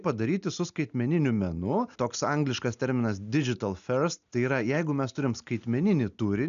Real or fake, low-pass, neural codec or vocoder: real; 7.2 kHz; none